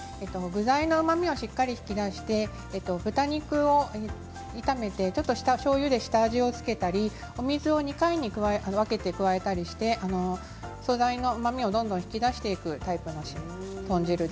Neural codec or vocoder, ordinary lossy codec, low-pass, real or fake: none; none; none; real